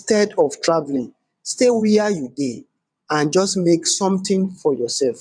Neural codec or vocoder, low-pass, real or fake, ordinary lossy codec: vocoder, 22.05 kHz, 80 mel bands, WaveNeXt; 9.9 kHz; fake; none